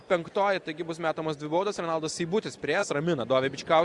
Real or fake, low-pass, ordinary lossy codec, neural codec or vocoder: real; 10.8 kHz; Opus, 64 kbps; none